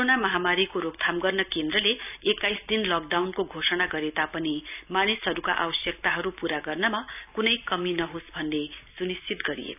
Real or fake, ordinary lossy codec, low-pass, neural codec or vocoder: real; none; 3.6 kHz; none